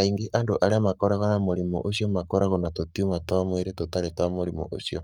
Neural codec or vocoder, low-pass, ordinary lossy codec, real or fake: codec, 44.1 kHz, 7.8 kbps, Pupu-Codec; 19.8 kHz; none; fake